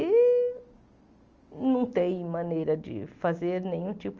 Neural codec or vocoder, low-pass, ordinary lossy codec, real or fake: none; 7.2 kHz; Opus, 24 kbps; real